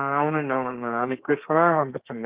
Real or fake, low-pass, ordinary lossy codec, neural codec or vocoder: fake; 3.6 kHz; Opus, 24 kbps; codec, 32 kHz, 1.9 kbps, SNAC